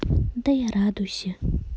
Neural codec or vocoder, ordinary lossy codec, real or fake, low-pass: none; none; real; none